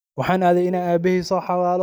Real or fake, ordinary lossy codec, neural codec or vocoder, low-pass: real; none; none; none